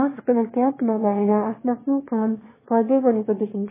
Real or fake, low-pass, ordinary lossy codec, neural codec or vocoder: fake; 3.6 kHz; MP3, 16 kbps; autoencoder, 22.05 kHz, a latent of 192 numbers a frame, VITS, trained on one speaker